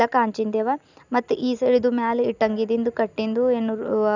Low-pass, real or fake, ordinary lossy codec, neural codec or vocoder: 7.2 kHz; real; none; none